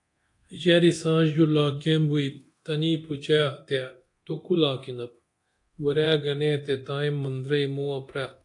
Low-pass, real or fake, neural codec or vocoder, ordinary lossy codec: 10.8 kHz; fake; codec, 24 kHz, 0.9 kbps, DualCodec; AAC, 48 kbps